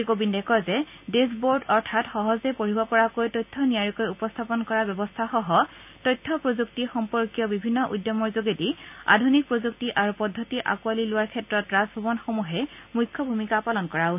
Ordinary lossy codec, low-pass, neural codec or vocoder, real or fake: none; 3.6 kHz; none; real